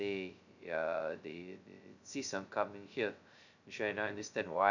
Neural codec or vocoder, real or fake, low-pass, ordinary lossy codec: codec, 16 kHz, 0.2 kbps, FocalCodec; fake; 7.2 kHz; none